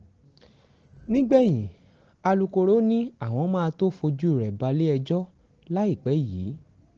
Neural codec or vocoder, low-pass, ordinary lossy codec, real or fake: none; 7.2 kHz; Opus, 16 kbps; real